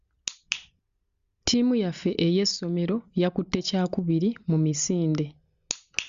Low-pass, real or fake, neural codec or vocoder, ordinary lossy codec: 7.2 kHz; real; none; Opus, 64 kbps